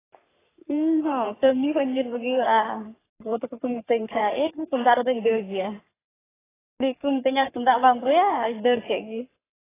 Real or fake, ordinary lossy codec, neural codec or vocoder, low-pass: fake; AAC, 16 kbps; codec, 44.1 kHz, 3.4 kbps, Pupu-Codec; 3.6 kHz